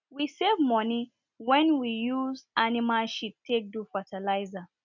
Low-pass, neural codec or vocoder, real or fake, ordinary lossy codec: 7.2 kHz; none; real; none